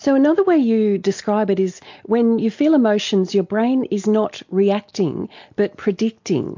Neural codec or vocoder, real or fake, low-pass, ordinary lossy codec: none; real; 7.2 kHz; MP3, 48 kbps